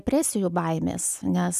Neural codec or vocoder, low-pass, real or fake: none; 14.4 kHz; real